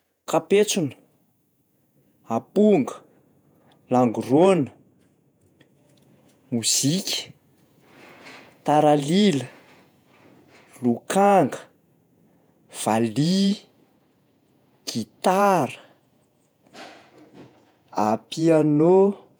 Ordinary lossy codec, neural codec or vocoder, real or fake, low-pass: none; vocoder, 48 kHz, 128 mel bands, Vocos; fake; none